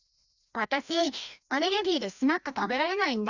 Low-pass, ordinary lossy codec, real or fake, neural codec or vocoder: 7.2 kHz; none; fake; codec, 16 kHz, 1 kbps, FreqCodec, larger model